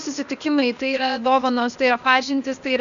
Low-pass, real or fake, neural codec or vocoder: 7.2 kHz; fake; codec, 16 kHz, 0.8 kbps, ZipCodec